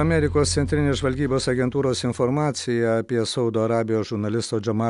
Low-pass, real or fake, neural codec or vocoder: 10.8 kHz; real; none